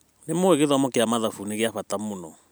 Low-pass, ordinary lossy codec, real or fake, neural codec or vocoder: none; none; fake; vocoder, 44.1 kHz, 128 mel bands every 256 samples, BigVGAN v2